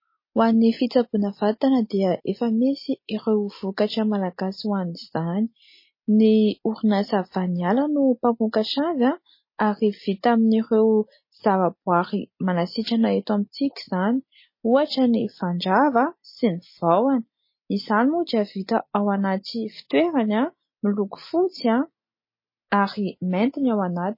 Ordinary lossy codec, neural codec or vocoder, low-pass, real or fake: MP3, 24 kbps; none; 5.4 kHz; real